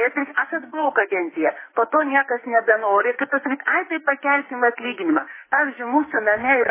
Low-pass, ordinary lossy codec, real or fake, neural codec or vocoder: 3.6 kHz; MP3, 16 kbps; fake; codec, 44.1 kHz, 2.6 kbps, SNAC